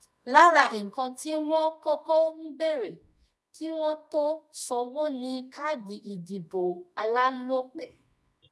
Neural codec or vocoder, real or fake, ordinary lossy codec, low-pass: codec, 24 kHz, 0.9 kbps, WavTokenizer, medium music audio release; fake; none; none